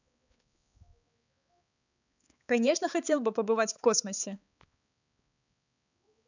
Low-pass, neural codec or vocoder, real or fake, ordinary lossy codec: 7.2 kHz; codec, 16 kHz, 4 kbps, X-Codec, HuBERT features, trained on balanced general audio; fake; none